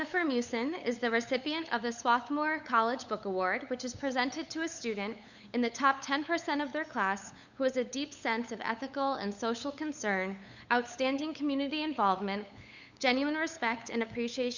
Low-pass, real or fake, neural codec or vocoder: 7.2 kHz; fake; codec, 16 kHz, 8 kbps, FunCodec, trained on LibriTTS, 25 frames a second